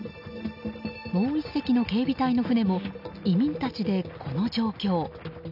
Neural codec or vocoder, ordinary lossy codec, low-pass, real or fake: none; none; 5.4 kHz; real